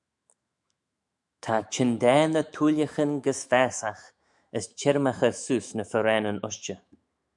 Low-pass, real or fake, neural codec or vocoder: 10.8 kHz; fake; autoencoder, 48 kHz, 128 numbers a frame, DAC-VAE, trained on Japanese speech